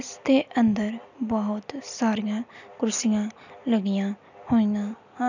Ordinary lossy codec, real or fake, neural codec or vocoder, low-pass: none; real; none; 7.2 kHz